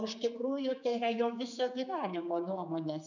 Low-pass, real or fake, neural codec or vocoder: 7.2 kHz; fake; codec, 16 kHz, 4 kbps, X-Codec, HuBERT features, trained on general audio